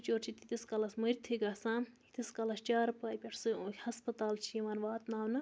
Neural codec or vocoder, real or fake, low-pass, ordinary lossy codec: none; real; none; none